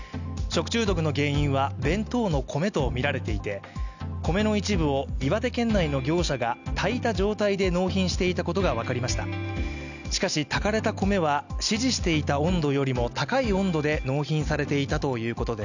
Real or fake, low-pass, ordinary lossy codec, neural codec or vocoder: real; 7.2 kHz; none; none